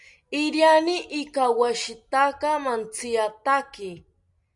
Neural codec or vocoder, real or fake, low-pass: none; real; 10.8 kHz